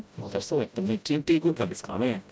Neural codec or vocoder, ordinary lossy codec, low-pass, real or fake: codec, 16 kHz, 0.5 kbps, FreqCodec, smaller model; none; none; fake